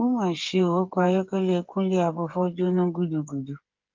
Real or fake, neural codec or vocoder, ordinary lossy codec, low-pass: fake; codec, 16 kHz, 8 kbps, FreqCodec, smaller model; Opus, 32 kbps; 7.2 kHz